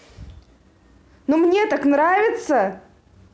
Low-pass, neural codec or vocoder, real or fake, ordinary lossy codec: none; none; real; none